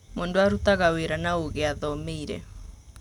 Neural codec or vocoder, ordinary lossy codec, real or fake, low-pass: none; none; real; 19.8 kHz